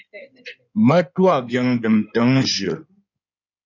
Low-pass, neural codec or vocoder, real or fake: 7.2 kHz; codec, 16 kHz in and 24 kHz out, 2.2 kbps, FireRedTTS-2 codec; fake